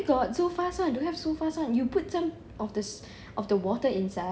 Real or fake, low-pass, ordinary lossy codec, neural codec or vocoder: real; none; none; none